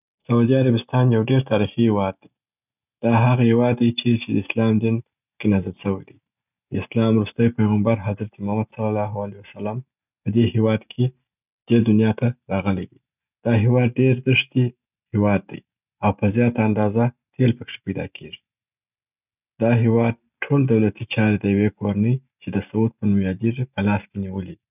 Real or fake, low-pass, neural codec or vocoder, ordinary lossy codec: real; 3.6 kHz; none; none